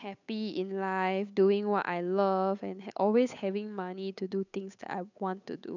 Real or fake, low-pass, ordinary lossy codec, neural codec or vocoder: fake; 7.2 kHz; none; codec, 16 kHz, 8 kbps, FunCodec, trained on Chinese and English, 25 frames a second